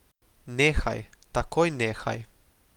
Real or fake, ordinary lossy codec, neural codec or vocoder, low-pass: real; Opus, 24 kbps; none; 19.8 kHz